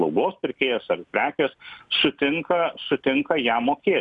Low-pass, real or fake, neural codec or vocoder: 10.8 kHz; real; none